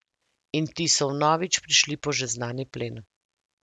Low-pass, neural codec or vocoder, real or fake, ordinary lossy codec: none; none; real; none